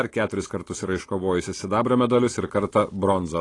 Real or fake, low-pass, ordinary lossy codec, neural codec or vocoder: real; 10.8 kHz; AAC, 32 kbps; none